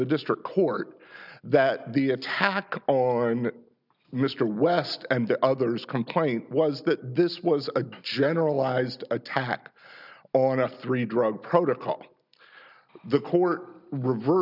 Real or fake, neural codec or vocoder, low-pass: real; none; 5.4 kHz